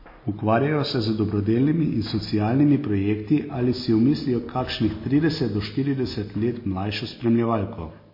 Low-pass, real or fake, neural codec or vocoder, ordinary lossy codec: 5.4 kHz; real; none; MP3, 24 kbps